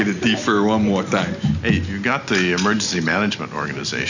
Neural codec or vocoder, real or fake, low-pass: none; real; 7.2 kHz